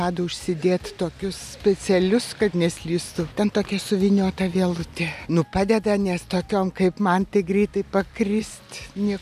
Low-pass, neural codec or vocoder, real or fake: 14.4 kHz; none; real